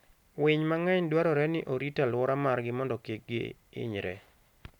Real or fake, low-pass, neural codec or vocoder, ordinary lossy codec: real; 19.8 kHz; none; none